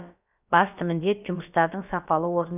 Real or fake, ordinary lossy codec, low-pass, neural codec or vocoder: fake; none; 3.6 kHz; codec, 16 kHz, about 1 kbps, DyCAST, with the encoder's durations